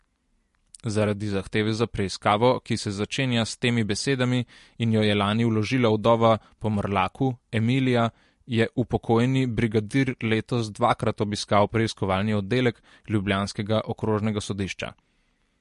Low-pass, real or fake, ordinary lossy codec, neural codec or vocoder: 14.4 kHz; real; MP3, 48 kbps; none